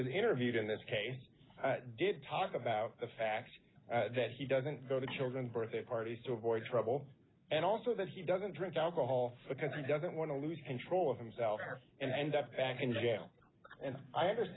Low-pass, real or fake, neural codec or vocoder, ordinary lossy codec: 7.2 kHz; real; none; AAC, 16 kbps